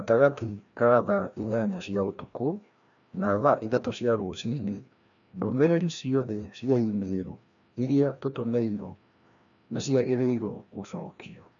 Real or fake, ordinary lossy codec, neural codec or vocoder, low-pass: fake; MP3, 96 kbps; codec, 16 kHz, 1 kbps, FreqCodec, larger model; 7.2 kHz